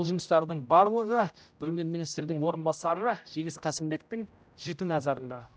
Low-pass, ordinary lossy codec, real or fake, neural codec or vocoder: none; none; fake; codec, 16 kHz, 0.5 kbps, X-Codec, HuBERT features, trained on general audio